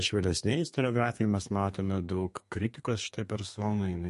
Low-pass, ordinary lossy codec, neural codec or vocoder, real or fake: 14.4 kHz; MP3, 48 kbps; codec, 32 kHz, 1.9 kbps, SNAC; fake